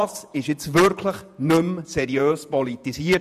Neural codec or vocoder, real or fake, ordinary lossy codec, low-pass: vocoder, 48 kHz, 128 mel bands, Vocos; fake; none; 14.4 kHz